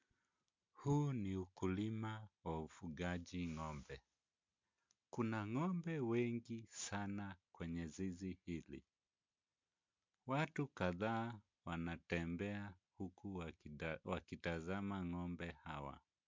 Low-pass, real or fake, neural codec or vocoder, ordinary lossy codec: 7.2 kHz; real; none; MP3, 64 kbps